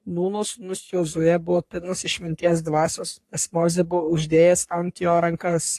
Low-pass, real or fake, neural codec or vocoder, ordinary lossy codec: 14.4 kHz; fake; codec, 44.1 kHz, 3.4 kbps, Pupu-Codec; AAC, 64 kbps